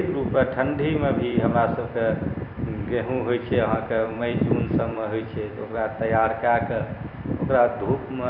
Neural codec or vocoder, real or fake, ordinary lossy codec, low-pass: vocoder, 44.1 kHz, 128 mel bands every 256 samples, BigVGAN v2; fake; none; 5.4 kHz